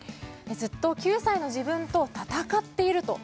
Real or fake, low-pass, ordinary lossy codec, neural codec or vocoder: real; none; none; none